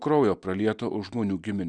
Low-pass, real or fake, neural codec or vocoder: 9.9 kHz; real; none